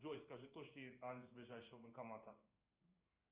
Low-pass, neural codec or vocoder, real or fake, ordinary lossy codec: 3.6 kHz; codec, 16 kHz in and 24 kHz out, 1 kbps, XY-Tokenizer; fake; Opus, 24 kbps